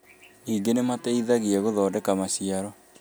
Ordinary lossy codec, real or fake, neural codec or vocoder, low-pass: none; real; none; none